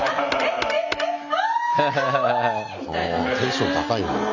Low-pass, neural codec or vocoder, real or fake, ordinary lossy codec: 7.2 kHz; none; real; none